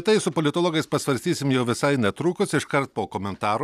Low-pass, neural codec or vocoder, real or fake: 14.4 kHz; none; real